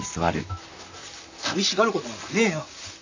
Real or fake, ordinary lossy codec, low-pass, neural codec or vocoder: fake; AAC, 48 kbps; 7.2 kHz; vocoder, 44.1 kHz, 128 mel bands, Pupu-Vocoder